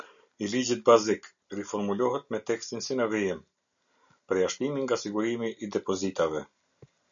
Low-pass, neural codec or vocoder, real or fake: 7.2 kHz; none; real